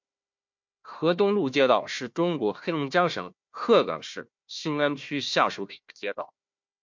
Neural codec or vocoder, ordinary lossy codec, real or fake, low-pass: codec, 16 kHz, 1 kbps, FunCodec, trained on Chinese and English, 50 frames a second; MP3, 48 kbps; fake; 7.2 kHz